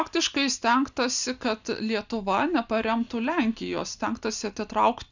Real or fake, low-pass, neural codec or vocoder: real; 7.2 kHz; none